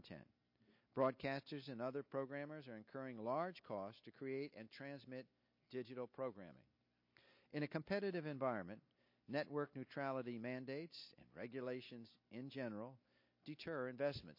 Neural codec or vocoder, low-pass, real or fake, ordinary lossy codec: none; 5.4 kHz; real; MP3, 32 kbps